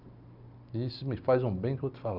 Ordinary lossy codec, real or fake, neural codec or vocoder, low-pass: none; real; none; 5.4 kHz